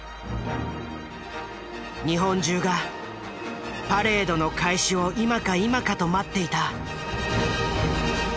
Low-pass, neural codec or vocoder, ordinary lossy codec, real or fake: none; none; none; real